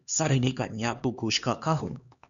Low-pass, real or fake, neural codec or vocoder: 7.2 kHz; fake; codec, 16 kHz, 2 kbps, X-Codec, HuBERT features, trained on LibriSpeech